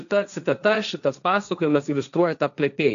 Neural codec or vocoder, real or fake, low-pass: codec, 16 kHz, 1.1 kbps, Voila-Tokenizer; fake; 7.2 kHz